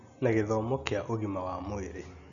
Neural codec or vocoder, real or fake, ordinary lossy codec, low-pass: none; real; none; 7.2 kHz